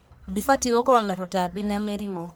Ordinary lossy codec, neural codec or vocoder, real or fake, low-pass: none; codec, 44.1 kHz, 1.7 kbps, Pupu-Codec; fake; none